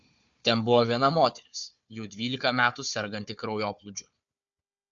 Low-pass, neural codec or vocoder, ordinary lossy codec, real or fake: 7.2 kHz; codec, 16 kHz, 4 kbps, FunCodec, trained on Chinese and English, 50 frames a second; MP3, 64 kbps; fake